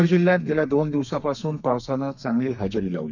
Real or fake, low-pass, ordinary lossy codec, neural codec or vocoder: fake; 7.2 kHz; none; codec, 32 kHz, 1.9 kbps, SNAC